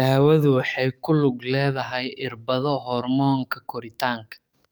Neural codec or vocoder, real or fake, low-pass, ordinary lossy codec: codec, 44.1 kHz, 7.8 kbps, DAC; fake; none; none